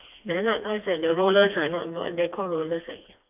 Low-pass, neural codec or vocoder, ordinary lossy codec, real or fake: 3.6 kHz; codec, 16 kHz, 2 kbps, FreqCodec, smaller model; none; fake